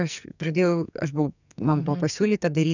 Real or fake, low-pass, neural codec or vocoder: fake; 7.2 kHz; codec, 44.1 kHz, 2.6 kbps, SNAC